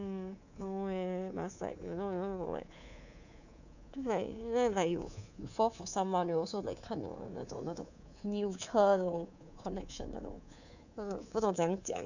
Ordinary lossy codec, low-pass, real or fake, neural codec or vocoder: none; 7.2 kHz; fake; codec, 24 kHz, 3.1 kbps, DualCodec